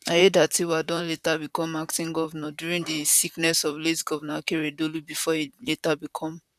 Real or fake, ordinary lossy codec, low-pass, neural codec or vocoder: fake; none; 14.4 kHz; vocoder, 44.1 kHz, 128 mel bands, Pupu-Vocoder